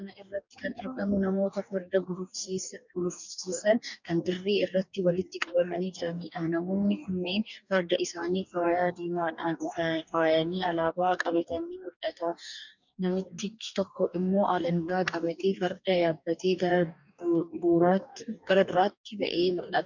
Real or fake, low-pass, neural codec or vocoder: fake; 7.2 kHz; codec, 44.1 kHz, 2.6 kbps, DAC